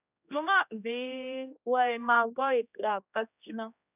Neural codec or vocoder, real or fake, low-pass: codec, 16 kHz, 1 kbps, X-Codec, HuBERT features, trained on general audio; fake; 3.6 kHz